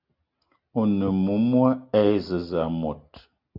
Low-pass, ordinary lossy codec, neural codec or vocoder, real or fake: 5.4 kHz; Opus, 64 kbps; none; real